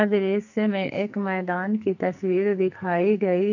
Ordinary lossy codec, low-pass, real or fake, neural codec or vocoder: none; 7.2 kHz; fake; codec, 44.1 kHz, 2.6 kbps, SNAC